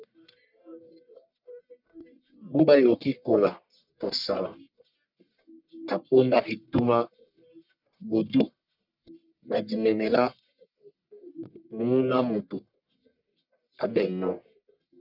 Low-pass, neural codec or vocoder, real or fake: 5.4 kHz; codec, 44.1 kHz, 1.7 kbps, Pupu-Codec; fake